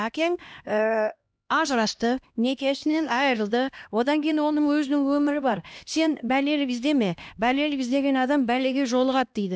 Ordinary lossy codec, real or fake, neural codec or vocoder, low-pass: none; fake; codec, 16 kHz, 1 kbps, X-Codec, HuBERT features, trained on LibriSpeech; none